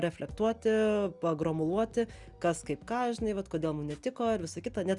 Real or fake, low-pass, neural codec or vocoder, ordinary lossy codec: real; 10.8 kHz; none; Opus, 64 kbps